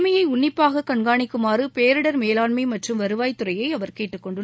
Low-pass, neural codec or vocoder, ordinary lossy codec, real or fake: none; none; none; real